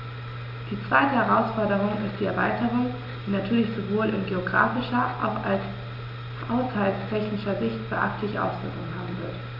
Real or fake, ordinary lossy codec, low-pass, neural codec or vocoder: real; none; 5.4 kHz; none